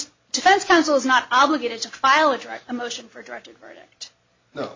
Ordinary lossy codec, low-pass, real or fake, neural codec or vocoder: MP3, 32 kbps; 7.2 kHz; real; none